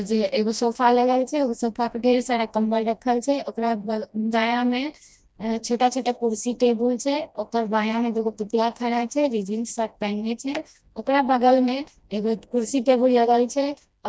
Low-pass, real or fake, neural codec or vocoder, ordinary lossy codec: none; fake; codec, 16 kHz, 1 kbps, FreqCodec, smaller model; none